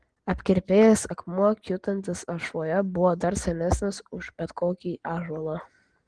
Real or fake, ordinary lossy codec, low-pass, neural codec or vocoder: real; Opus, 16 kbps; 9.9 kHz; none